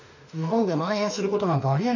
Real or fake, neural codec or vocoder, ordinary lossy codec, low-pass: fake; autoencoder, 48 kHz, 32 numbers a frame, DAC-VAE, trained on Japanese speech; none; 7.2 kHz